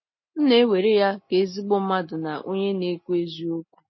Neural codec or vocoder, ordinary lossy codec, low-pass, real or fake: none; MP3, 24 kbps; 7.2 kHz; real